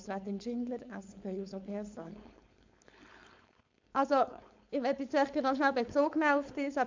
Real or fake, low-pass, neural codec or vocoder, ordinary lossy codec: fake; 7.2 kHz; codec, 16 kHz, 4.8 kbps, FACodec; MP3, 64 kbps